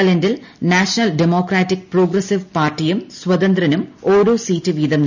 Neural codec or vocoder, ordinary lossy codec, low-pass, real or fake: none; none; 7.2 kHz; real